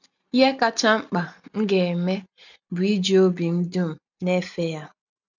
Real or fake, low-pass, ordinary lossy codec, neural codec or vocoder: real; 7.2 kHz; none; none